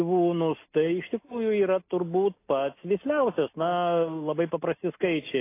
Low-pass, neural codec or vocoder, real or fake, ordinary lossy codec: 3.6 kHz; none; real; AAC, 24 kbps